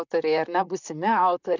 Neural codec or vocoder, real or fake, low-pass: vocoder, 44.1 kHz, 128 mel bands, Pupu-Vocoder; fake; 7.2 kHz